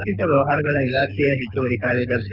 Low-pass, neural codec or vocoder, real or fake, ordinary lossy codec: 5.4 kHz; codec, 24 kHz, 6 kbps, HILCodec; fake; none